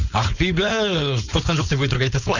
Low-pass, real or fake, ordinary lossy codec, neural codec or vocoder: 7.2 kHz; fake; none; codec, 16 kHz, 4.8 kbps, FACodec